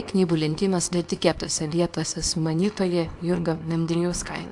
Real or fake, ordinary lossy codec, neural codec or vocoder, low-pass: fake; AAC, 64 kbps; codec, 24 kHz, 0.9 kbps, WavTokenizer, small release; 10.8 kHz